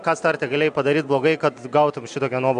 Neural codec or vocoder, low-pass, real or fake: none; 9.9 kHz; real